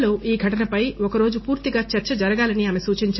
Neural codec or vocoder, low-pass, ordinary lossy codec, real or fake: none; 7.2 kHz; MP3, 24 kbps; real